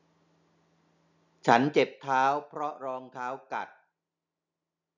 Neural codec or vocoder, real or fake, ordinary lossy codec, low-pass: none; real; none; 7.2 kHz